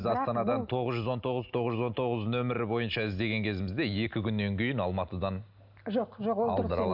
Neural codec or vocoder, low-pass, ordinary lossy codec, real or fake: none; 5.4 kHz; none; real